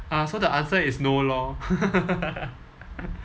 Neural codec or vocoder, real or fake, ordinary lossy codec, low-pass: none; real; none; none